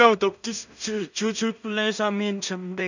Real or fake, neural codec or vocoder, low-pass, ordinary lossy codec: fake; codec, 16 kHz in and 24 kHz out, 0.4 kbps, LongCat-Audio-Codec, two codebook decoder; 7.2 kHz; none